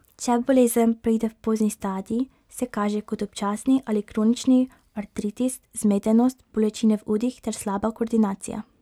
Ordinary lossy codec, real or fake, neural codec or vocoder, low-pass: none; real; none; 19.8 kHz